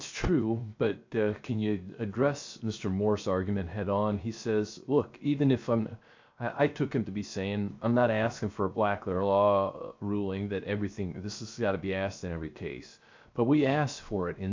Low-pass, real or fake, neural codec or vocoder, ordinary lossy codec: 7.2 kHz; fake; codec, 16 kHz, 0.7 kbps, FocalCodec; MP3, 64 kbps